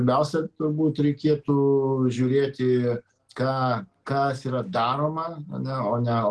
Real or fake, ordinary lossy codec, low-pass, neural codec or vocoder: real; Opus, 16 kbps; 10.8 kHz; none